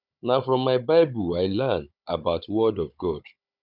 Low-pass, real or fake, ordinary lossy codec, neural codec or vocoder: 5.4 kHz; fake; none; codec, 16 kHz, 16 kbps, FunCodec, trained on Chinese and English, 50 frames a second